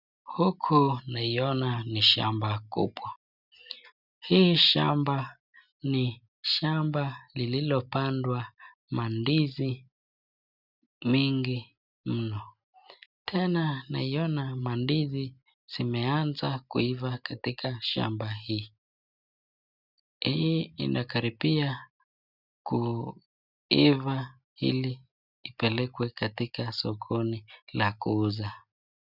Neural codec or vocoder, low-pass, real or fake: none; 5.4 kHz; real